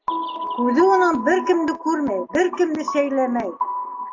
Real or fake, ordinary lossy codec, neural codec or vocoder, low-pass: real; AAC, 48 kbps; none; 7.2 kHz